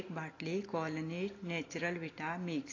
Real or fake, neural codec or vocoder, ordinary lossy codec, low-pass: real; none; AAC, 32 kbps; 7.2 kHz